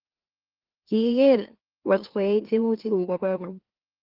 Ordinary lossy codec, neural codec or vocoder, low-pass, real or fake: Opus, 16 kbps; autoencoder, 44.1 kHz, a latent of 192 numbers a frame, MeloTTS; 5.4 kHz; fake